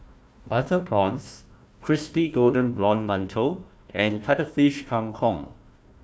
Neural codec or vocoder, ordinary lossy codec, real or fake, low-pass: codec, 16 kHz, 1 kbps, FunCodec, trained on Chinese and English, 50 frames a second; none; fake; none